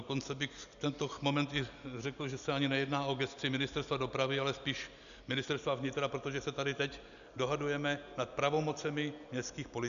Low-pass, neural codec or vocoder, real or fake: 7.2 kHz; none; real